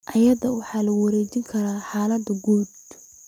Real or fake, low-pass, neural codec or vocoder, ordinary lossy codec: real; 19.8 kHz; none; none